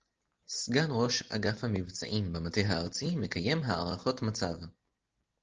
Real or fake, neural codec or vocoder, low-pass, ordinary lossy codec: real; none; 7.2 kHz; Opus, 16 kbps